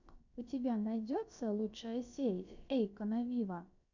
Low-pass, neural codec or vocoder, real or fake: 7.2 kHz; codec, 16 kHz, about 1 kbps, DyCAST, with the encoder's durations; fake